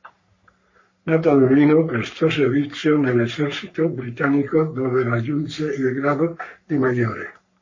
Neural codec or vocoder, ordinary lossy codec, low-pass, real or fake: codec, 44.1 kHz, 3.4 kbps, Pupu-Codec; MP3, 32 kbps; 7.2 kHz; fake